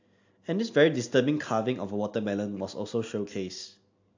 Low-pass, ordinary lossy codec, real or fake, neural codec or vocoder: 7.2 kHz; AAC, 48 kbps; real; none